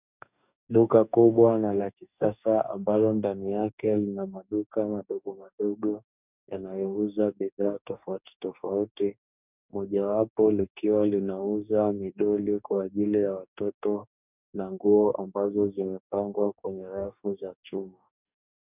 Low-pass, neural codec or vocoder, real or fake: 3.6 kHz; codec, 44.1 kHz, 2.6 kbps, DAC; fake